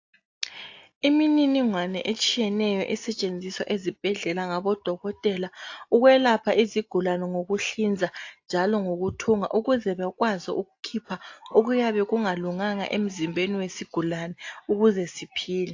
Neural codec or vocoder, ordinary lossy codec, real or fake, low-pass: none; AAC, 48 kbps; real; 7.2 kHz